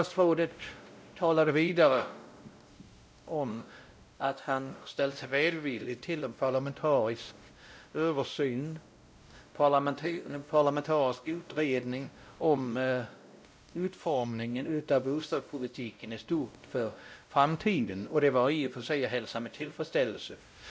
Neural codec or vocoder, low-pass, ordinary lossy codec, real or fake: codec, 16 kHz, 0.5 kbps, X-Codec, WavLM features, trained on Multilingual LibriSpeech; none; none; fake